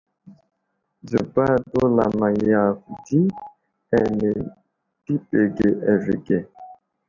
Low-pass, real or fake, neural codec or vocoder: 7.2 kHz; real; none